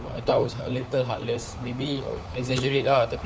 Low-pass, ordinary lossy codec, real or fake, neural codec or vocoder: none; none; fake; codec, 16 kHz, 4 kbps, FunCodec, trained on LibriTTS, 50 frames a second